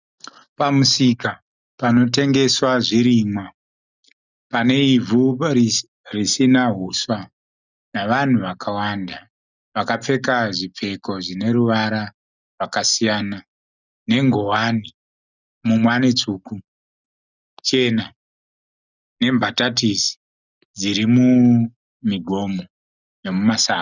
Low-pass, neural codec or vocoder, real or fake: 7.2 kHz; none; real